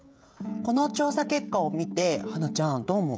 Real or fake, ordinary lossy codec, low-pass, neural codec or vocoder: fake; none; none; codec, 16 kHz, 16 kbps, FreqCodec, smaller model